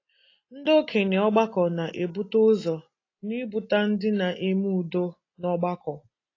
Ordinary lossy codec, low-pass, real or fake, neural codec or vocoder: AAC, 32 kbps; 7.2 kHz; real; none